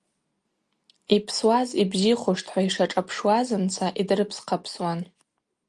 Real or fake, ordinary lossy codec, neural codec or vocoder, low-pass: real; Opus, 32 kbps; none; 10.8 kHz